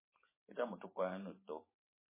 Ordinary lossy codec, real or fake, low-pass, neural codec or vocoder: MP3, 16 kbps; fake; 3.6 kHz; vocoder, 44.1 kHz, 128 mel bands every 512 samples, BigVGAN v2